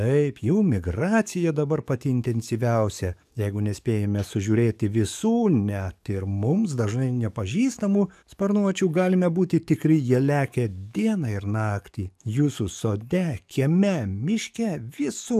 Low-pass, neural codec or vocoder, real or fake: 14.4 kHz; codec, 44.1 kHz, 7.8 kbps, DAC; fake